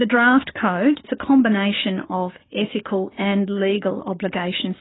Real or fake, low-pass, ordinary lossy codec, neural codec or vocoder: fake; 7.2 kHz; AAC, 16 kbps; codec, 16 kHz, 6 kbps, DAC